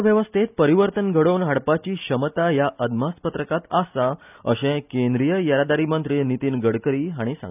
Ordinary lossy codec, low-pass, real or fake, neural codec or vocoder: none; 3.6 kHz; real; none